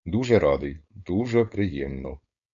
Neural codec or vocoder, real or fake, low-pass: codec, 16 kHz, 4.8 kbps, FACodec; fake; 7.2 kHz